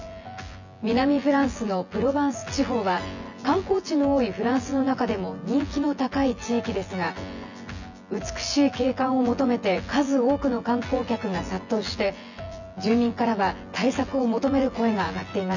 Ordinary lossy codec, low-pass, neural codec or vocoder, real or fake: none; 7.2 kHz; vocoder, 24 kHz, 100 mel bands, Vocos; fake